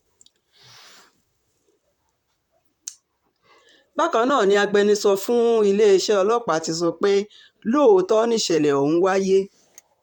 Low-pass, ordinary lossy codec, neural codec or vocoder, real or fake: 19.8 kHz; none; vocoder, 44.1 kHz, 128 mel bands, Pupu-Vocoder; fake